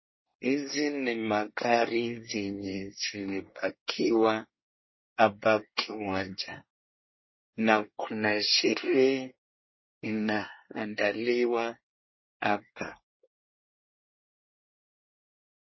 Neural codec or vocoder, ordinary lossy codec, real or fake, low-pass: codec, 24 kHz, 1 kbps, SNAC; MP3, 24 kbps; fake; 7.2 kHz